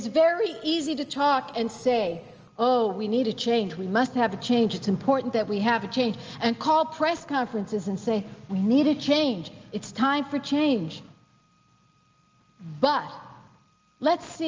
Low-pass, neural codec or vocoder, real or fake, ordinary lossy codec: 7.2 kHz; none; real; Opus, 24 kbps